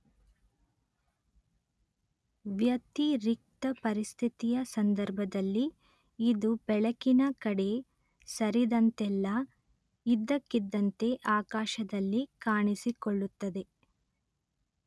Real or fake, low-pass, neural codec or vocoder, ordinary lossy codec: real; none; none; none